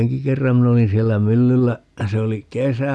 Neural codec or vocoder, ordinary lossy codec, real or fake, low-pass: none; none; real; none